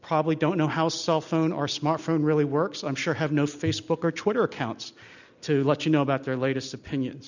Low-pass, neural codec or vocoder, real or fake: 7.2 kHz; none; real